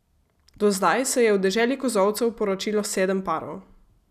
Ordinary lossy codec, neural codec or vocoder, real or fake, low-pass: none; none; real; 14.4 kHz